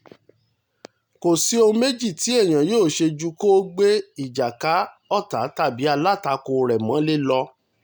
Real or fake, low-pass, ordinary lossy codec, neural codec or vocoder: real; none; none; none